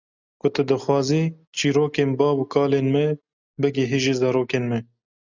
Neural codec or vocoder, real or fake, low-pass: none; real; 7.2 kHz